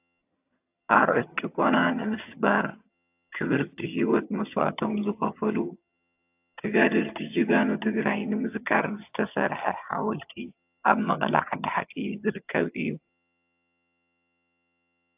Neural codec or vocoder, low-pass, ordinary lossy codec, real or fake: vocoder, 22.05 kHz, 80 mel bands, HiFi-GAN; 3.6 kHz; AAC, 32 kbps; fake